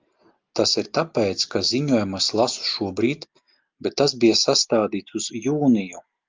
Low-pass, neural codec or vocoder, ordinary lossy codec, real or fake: 7.2 kHz; none; Opus, 32 kbps; real